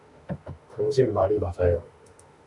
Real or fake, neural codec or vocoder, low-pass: fake; autoencoder, 48 kHz, 32 numbers a frame, DAC-VAE, trained on Japanese speech; 10.8 kHz